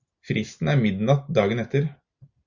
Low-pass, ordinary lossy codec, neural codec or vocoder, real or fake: 7.2 kHz; Opus, 64 kbps; none; real